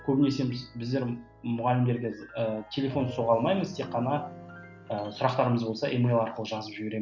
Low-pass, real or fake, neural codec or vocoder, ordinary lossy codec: 7.2 kHz; real; none; none